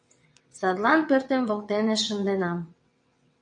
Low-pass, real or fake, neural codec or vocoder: 9.9 kHz; fake; vocoder, 22.05 kHz, 80 mel bands, WaveNeXt